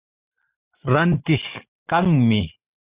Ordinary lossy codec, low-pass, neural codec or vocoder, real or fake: Opus, 64 kbps; 3.6 kHz; none; real